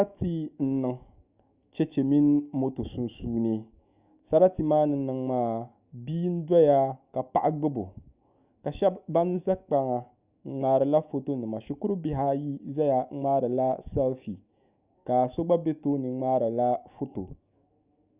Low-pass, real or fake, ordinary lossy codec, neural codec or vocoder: 3.6 kHz; fake; Opus, 64 kbps; autoencoder, 48 kHz, 128 numbers a frame, DAC-VAE, trained on Japanese speech